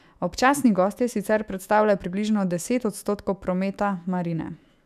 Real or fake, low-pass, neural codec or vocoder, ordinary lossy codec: fake; 14.4 kHz; autoencoder, 48 kHz, 128 numbers a frame, DAC-VAE, trained on Japanese speech; none